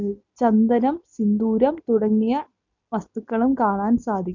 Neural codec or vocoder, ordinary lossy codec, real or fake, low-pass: none; none; real; 7.2 kHz